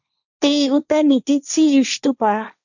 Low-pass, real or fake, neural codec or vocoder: 7.2 kHz; fake; codec, 16 kHz, 1.1 kbps, Voila-Tokenizer